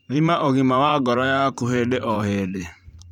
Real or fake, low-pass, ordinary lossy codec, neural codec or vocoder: fake; 19.8 kHz; none; vocoder, 44.1 kHz, 128 mel bands every 512 samples, BigVGAN v2